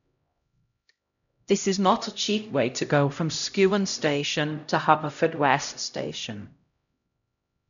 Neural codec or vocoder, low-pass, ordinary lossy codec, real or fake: codec, 16 kHz, 0.5 kbps, X-Codec, HuBERT features, trained on LibriSpeech; 7.2 kHz; none; fake